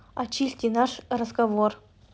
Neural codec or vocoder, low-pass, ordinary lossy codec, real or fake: none; none; none; real